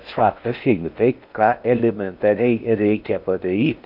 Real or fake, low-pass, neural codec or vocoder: fake; 5.4 kHz; codec, 16 kHz in and 24 kHz out, 0.6 kbps, FocalCodec, streaming, 4096 codes